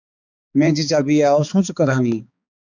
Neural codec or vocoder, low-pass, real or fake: codec, 16 kHz, 4 kbps, X-Codec, HuBERT features, trained on balanced general audio; 7.2 kHz; fake